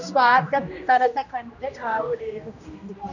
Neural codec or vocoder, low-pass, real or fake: codec, 16 kHz, 1 kbps, X-Codec, HuBERT features, trained on general audio; 7.2 kHz; fake